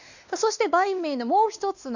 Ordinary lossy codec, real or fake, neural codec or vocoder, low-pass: none; fake; codec, 16 kHz, 2 kbps, X-Codec, WavLM features, trained on Multilingual LibriSpeech; 7.2 kHz